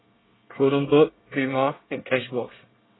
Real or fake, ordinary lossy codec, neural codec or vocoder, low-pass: fake; AAC, 16 kbps; codec, 24 kHz, 1 kbps, SNAC; 7.2 kHz